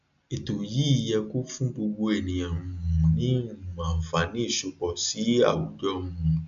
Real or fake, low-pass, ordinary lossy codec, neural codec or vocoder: real; 7.2 kHz; AAC, 64 kbps; none